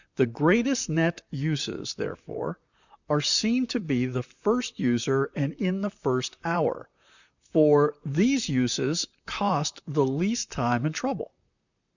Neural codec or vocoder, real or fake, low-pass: vocoder, 44.1 kHz, 128 mel bands, Pupu-Vocoder; fake; 7.2 kHz